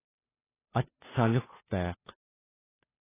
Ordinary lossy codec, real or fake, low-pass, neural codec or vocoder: AAC, 16 kbps; fake; 3.6 kHz; codec, 16 kHz, 2 kbps, FunCodec, trained on Chinese and English, 25 frames a second